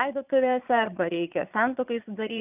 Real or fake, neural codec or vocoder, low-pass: fake; vocoder, 22.05 kHz, 80 mel bands, Vocos; 3.6 kHz